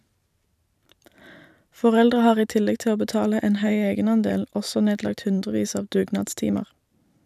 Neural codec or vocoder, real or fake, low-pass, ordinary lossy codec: vocoder, 44.1 kHz, 128 mel bands every 512 samples, BigVGAN v2; fake; 14.4 kHz; none